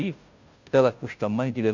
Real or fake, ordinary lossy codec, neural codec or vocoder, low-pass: fake; none; codec, 16 kHz, 0.5 kbps, FunCodec, trained on Chinese and English, 25 frames a second; 7.2 kHz